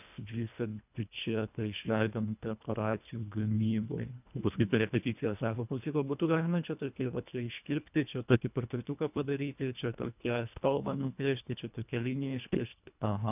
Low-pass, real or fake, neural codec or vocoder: 3.6 kHz; fake; codec, 24 kHz, 1.5 kbps, HILCodec